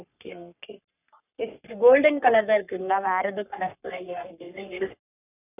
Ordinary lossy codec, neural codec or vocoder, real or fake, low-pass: none; codec, 44.1 kHz, 3.4 kbps, Pupu-Codec; fake; 3.6 kHz